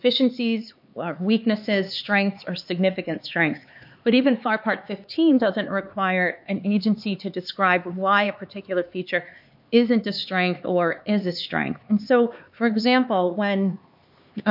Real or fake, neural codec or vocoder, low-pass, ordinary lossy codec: fake; codec, 16 kHz, 4 kbps, X-Codec, HuBERT features, trained on LibriSpeech; 5.4 kHz; MP3, 48 kbps